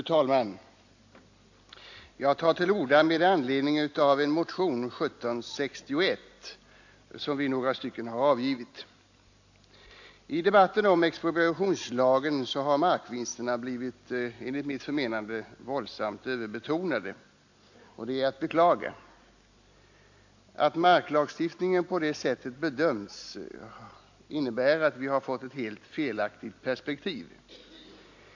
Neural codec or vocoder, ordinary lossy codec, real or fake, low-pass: none; AAC, 48 kbps; real; 7.2 kHz